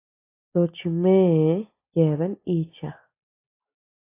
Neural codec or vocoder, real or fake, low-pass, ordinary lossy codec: none; real; 3.6 kHz; AAC, 24 kbps